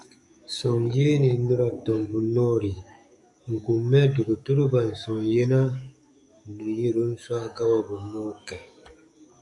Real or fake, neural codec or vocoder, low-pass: fake; codec, 44.1 kHz, 7.8 kbps, DAC; 10.8 kHz